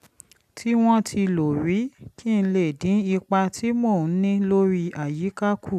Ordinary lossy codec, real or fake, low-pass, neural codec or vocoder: none; real; 14.4 kHz; none